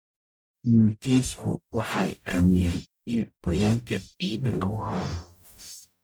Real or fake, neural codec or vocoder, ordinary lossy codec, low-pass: fake; codec, 44.1 kHz, 0.9 kbps, DAC; none; none